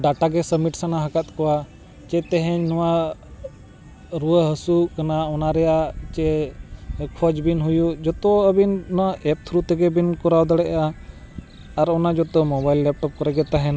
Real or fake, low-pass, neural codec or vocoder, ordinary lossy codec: real; none; none; none